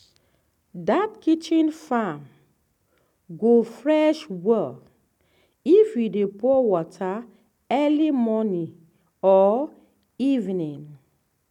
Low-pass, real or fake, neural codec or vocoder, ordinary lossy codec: 19.8 kHz; real; none; none